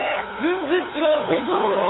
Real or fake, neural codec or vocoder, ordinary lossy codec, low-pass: fake; codec, 16 kHz, 8 kbps, FunCodec, trained on LibriTTS, 25 frames a second; AAC, 16 kbps; 7.2 kHz